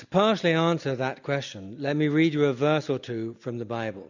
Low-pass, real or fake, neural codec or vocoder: 7.2 kHz; real; none